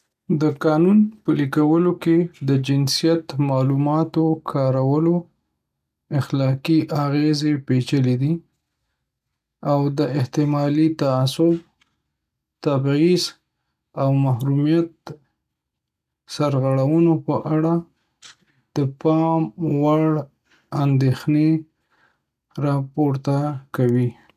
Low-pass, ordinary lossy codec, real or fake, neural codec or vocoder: 14.4 kHz; none; real; none